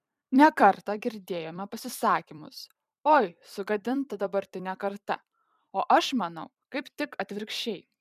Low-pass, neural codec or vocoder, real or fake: 14.4 kHz; vocoder, 44.1 kHz, 128 mel bands every 256 samples, BigVGAN v2; fake